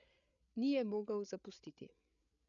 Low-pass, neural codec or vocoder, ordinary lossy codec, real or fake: 7.2 kHz; codec, 16 kHz, 8 kbps, FreqCodec, larger model; none; fake